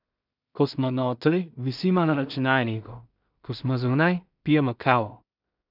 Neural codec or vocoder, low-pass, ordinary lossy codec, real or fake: codec, 16 kHz in and 24 kHz out, 0.4 kbps, LongCat-Audio-Codec, two codebook decoder; 5.4 kHz; none; fake